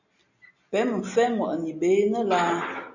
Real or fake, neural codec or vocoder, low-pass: real; none; 7.2 kHz